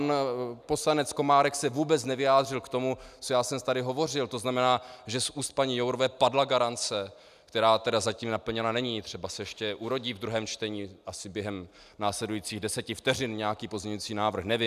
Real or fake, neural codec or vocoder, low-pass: real; none; 14.4 kHz